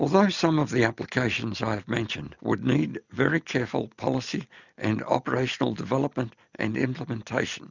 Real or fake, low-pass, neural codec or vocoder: real; 7.2 kHz; none